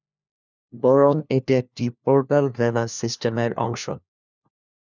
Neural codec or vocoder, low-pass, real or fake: codec, 16 kHz, 1 kbps, FunCodec, trained on LibriTTS, 50 frames a second; 7.2 kHz; fake